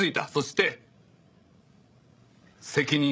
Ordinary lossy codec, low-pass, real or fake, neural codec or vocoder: none; none; fake; codec, 16 kHz, 16 kbps, FreqCodec, larger model